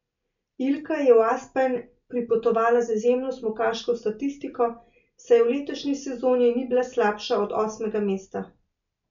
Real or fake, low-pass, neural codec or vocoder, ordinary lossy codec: real; 7.2 kHz; none; none